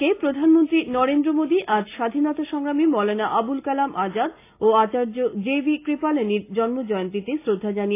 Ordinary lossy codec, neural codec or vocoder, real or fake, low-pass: AAC, 24 kbps; none; real; 3.6 kHz